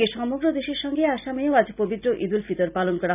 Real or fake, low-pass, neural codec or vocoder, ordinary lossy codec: real; 3.6 kHz; none; none